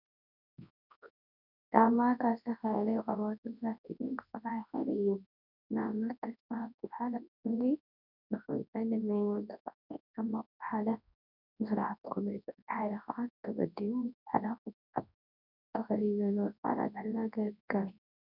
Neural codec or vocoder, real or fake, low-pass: codec, 24 kHz, 0.9 kbps, WavTokenizer, large speech release; fake; 5.4 kHz